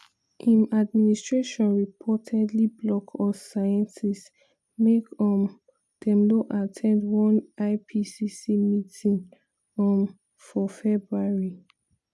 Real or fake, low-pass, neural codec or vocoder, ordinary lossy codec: real; none; none; none